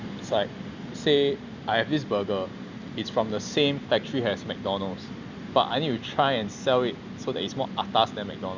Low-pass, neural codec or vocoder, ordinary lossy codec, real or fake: 7.2 kHz; none; Opus, 64 kbps; real